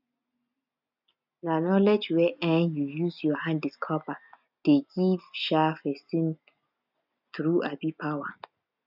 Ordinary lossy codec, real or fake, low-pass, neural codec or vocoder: none; real; 5.4 kHz; none